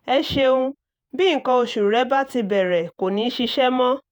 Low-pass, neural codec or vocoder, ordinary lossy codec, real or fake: 19.8 kHz; vocoder, 48 kHz, 128 mel bands, Vocos; none; fake